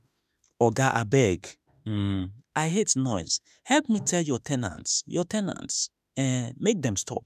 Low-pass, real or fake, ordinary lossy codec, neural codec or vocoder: 14.4 kHz; fake; none; autoencoder, 48 kHz, 32 numbers a frame, DAC-VAE, trained on Japanese speech